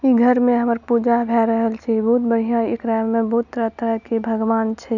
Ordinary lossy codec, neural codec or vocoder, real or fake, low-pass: none; none; real; 7.2 kHz